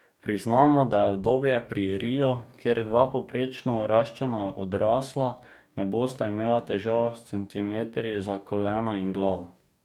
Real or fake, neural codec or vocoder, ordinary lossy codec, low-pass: fake; codec, 44.1 kHz, 2.6 kbps, DAC; none; 19.8 kHz